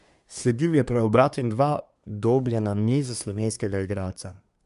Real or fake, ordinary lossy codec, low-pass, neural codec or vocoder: fake; none; 10.8 kHz; codec, 24 kHz, 1 kbps, SNAC